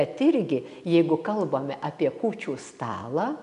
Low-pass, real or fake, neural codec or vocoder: 10.8 kHz; real; none